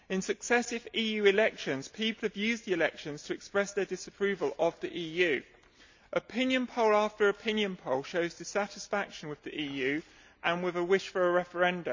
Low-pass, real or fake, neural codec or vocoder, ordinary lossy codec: 7.2 kHz; real; none; MP3, 64 kbps